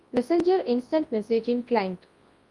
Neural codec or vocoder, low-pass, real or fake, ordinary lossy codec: codec, 24 kHz, 0.9 kbps, WavTokenizer, large speech release; 10.8 kHz; fake; Opus, 32 kbps